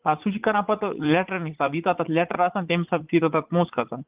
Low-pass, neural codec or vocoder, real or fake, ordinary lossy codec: 3.6 kHz; vocoder, 44.1 kHz, 80 mel bands, Vocos; fake; Opus, 32 kbps